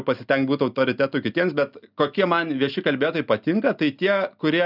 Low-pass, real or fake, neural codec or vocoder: 5.4 kHz; real; none